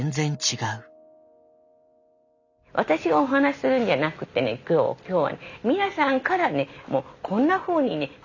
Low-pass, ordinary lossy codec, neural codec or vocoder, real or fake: 7.2 kHz; none; none; real